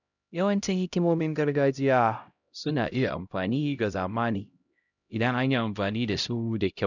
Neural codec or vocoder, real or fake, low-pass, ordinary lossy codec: codec, 16 kHz, 0.5 kbps, X-Codec, HuBERT features, trained on LibriSpeech; fake; 7.2 kHz; none